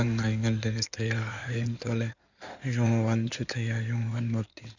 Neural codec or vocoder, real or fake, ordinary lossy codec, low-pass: codec, 16 kHz in and 24 kHz out, 2.2 kbps, FireRedTTS-2 codec; fake; none; 7.2 kHz